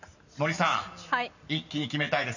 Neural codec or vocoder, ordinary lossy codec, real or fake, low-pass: none; none; real; 7.2 kHz